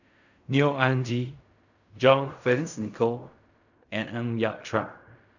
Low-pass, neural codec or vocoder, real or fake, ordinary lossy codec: 7.2 kHz; codec, 16 kHz in and 24 kHz out, 0.4 kbps, LongCat-Audio-Codec, fine tuned four codebook decoder; fake; none